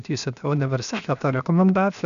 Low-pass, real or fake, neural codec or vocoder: 7.2 kHz; fake; codec, 16 kHz, 0.7 kbps, FocalCodec